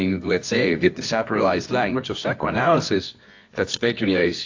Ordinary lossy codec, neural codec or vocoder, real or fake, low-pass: AAC, 48 kbps; codec, 24 kHz, 0.9 kbps, WavTokenizer, medium music audio release; fake; 7.2 kHz